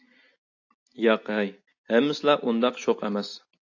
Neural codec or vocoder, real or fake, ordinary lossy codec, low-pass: none; real; AAC, 48 kbps; 7.2 kHz